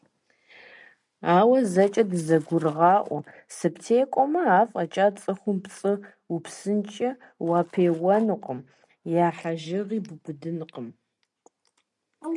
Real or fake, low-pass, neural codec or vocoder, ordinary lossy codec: real; 10.8 kHz; none; MP3, 64 kbps